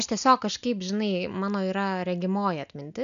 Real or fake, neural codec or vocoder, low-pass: real; none; 7.2 kHz